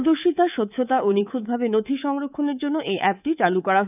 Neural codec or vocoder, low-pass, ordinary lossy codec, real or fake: codec, 24 kHz, 3.1 kbps, DualCodec; 3.6 kHz; none; fake